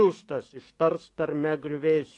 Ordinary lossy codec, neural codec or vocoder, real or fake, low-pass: AAC, 48 kbps; codec, 44.1 kHz, 7.8 kbps, DAC; fake; 10.8 kHz